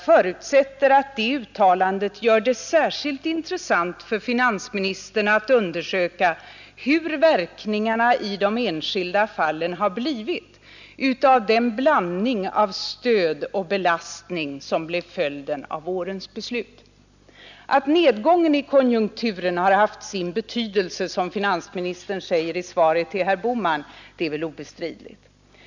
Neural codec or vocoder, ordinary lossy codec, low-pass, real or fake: none; none; 7.2 kHz; real